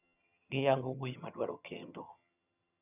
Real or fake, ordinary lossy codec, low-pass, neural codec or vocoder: fake; none; 3.6 kHz; vocoder, 22.05 kHz, 80 mel bands, HiFi-GAN